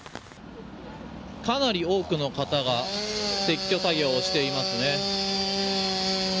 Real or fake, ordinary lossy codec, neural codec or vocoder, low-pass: real; none; none; none